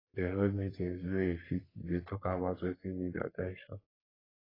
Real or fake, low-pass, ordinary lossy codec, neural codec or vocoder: fake; 5.4 kHz; AAC, 24 kbps; codec, 44.1 kHz, 3.4 kbps, Pupu-Codec